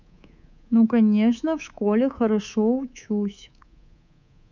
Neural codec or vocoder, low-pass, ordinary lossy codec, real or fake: codec, 24 kHz, 3.1 kbps, DualCodec; 7.2 kHz; none; fake